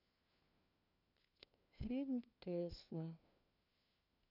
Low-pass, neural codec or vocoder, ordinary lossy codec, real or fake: 5.4 kHz; codec, 16 kHz, 1 kbps, FunCodec, trained on LibriTTS, 50 frames a second; none; fake